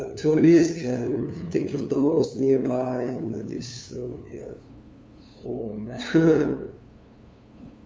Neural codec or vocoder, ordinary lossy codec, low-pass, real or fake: codec, 16 kHz, 2 kbps, FunCodec, trained on LibriTTS, 25 frames a second; none; none; fake